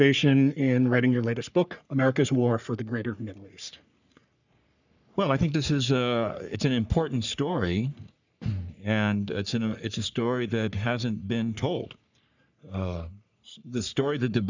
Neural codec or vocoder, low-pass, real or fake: codec, 44.1 kHz, 3.4 kbps, Pupu-Codec; 7.2 kHz; fake